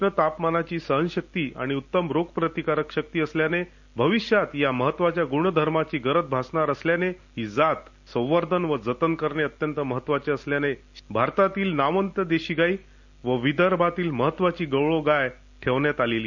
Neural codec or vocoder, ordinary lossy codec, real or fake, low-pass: none; none; real; 7.2 kHz